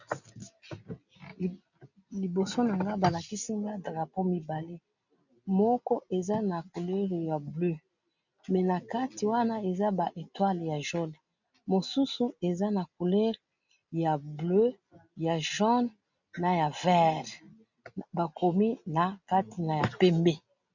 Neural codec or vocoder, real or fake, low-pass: none; real; 7.2 kHz